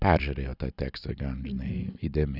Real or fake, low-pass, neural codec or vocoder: real; 5.4 kHz; none